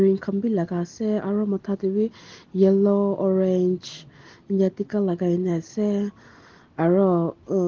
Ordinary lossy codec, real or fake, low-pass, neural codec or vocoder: Opus, 16 kbps; real; 7.2 kHz; none